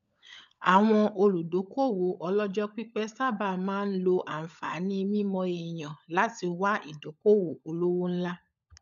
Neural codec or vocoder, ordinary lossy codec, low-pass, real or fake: codec, 16 kHz, 16 kbps, FunCodec, trained on LibriTTS, 50 frames a second; none; 7.2 kHz; fake